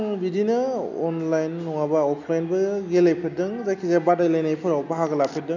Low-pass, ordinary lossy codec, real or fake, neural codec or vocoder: 7.2 kHz; none; real; none